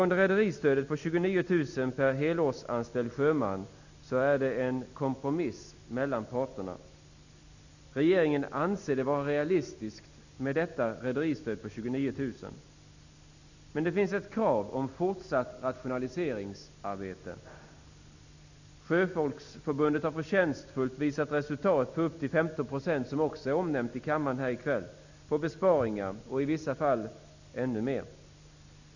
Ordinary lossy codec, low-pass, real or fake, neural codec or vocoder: none; 7.2 kHz; real; none